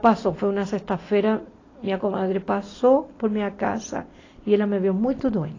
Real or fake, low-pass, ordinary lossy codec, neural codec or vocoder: real; 7.2 kHz; AAC, 32 kbps; none